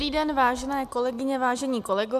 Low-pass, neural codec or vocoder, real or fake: 14.4 kHz; none; real